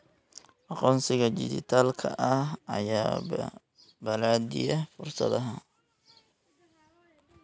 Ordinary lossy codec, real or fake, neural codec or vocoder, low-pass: none; real; none; none